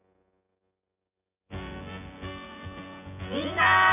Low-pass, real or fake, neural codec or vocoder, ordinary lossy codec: 3.6 kHz; fake; vocoder, 24 kHz, 100 mel bands, Vocos; none